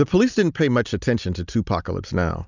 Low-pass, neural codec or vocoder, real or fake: 7.2 kHz; none; real